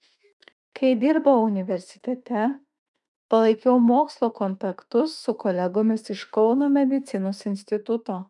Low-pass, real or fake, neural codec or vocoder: 10.8 kHz; fake; autoencoder, 48 kHz, 32 numbers a frame, DAC-VAE, trained on Japanese speech